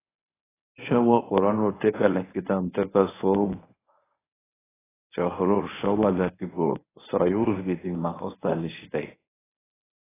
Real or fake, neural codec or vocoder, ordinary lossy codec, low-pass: fake; codec, 24 kHz, 0.9 kbps, WavTokenizer, medium speech release version 1; AAC, 16 kbps; 3.6 kHz